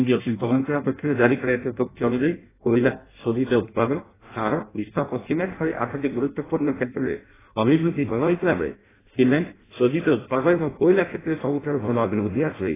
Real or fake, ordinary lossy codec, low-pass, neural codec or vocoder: fake; AAC, 16 kbps; 3.6 kHz; codec, 16 kHz in and 24 kHz out, 0.6 kbps, FireRedTTS-2 codec